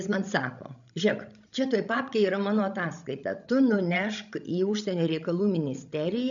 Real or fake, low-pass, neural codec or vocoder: fake; 7.2 kHz; codec, 16 kHz, 16 kbps, FreqCodec, larger model